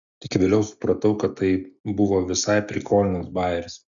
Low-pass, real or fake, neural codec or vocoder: 7.2 kHz; real; none